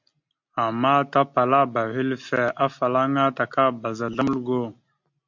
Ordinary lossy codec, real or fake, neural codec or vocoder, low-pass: MP3, 48 kbps; real; none; 7.2 kHz